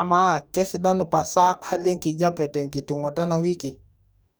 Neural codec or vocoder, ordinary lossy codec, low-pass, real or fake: codec, 44.1 kHz, 2.6 kbps, DAC; none; none; fake